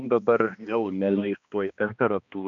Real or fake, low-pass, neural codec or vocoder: fake; 7.2 kHz; codec, 16 kHz, 2 kbps, X-Codec, HuBERT features, trained on balanced general audio